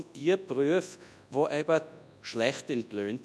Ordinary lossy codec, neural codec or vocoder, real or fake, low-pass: none; codec, 24 kHz, 0.9 kbps, WavTokenizer, large speech release; fake; none